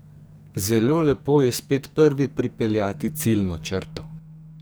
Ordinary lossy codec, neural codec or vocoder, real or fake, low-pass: none; codec, 44.1 kHz, 2.6 kbps, SNAC; fake; none